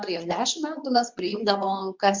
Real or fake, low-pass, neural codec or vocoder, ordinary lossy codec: fake; 7.2 kHz; codec, 24 kHz, 0.9 kbps, WavTokenizer, medium speech release version 2; MP3, 64 kbps